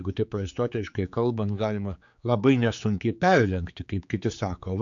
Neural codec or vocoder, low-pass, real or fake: codec, 16 kHz, 4 kbps, X-Codec, HuBERT features, trained on general audio; 7.2 kHz; fake